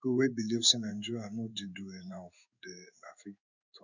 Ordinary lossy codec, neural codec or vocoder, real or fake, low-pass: none; codec, 16 kHz in and 24 kHz out, 1 kbps, XY-Tokenizer; fake; 7.2 kHz